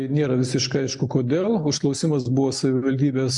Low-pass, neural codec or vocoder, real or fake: 10.8 kHz; none; real